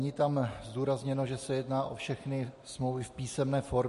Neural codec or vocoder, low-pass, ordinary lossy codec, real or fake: none; 14.4 kHz; MP3, 48 kbps; real